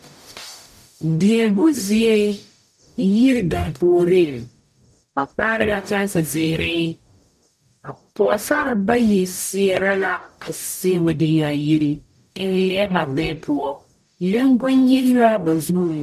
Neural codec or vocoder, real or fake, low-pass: codec, 44.1 kHz, 0.9 kbps, DAC; fake; 14.4 kHz